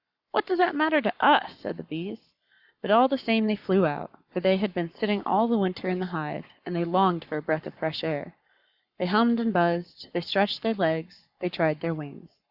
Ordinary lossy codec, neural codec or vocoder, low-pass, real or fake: Opus, 64 kbps; codec, 44.1 kHz, 7.8 kbps, Pupu-Codec; 5.4 kHz; fake